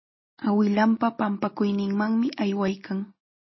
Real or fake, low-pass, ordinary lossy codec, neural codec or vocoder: real; 7.2 kHz; MP3, 24 kbps; none